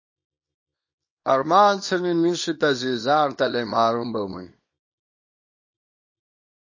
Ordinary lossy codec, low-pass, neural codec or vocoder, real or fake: MP3, 32 kbps; 7.2 kHz; codec, 24 kHz, 0.9 kbps, WavTokenizer, small release; fake